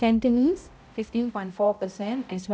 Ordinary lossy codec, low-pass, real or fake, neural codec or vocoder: none; none; fake; codec, 16 kHz, 0.5 kbps, X-Codec, HuBERT features, trained on balanced general audio